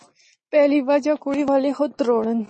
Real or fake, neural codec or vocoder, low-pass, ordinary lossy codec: real; none; 10.8 kHz; MP3, 32 kbps